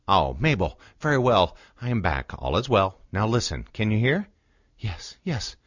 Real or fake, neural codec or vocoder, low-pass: real; none; 7.2 kHz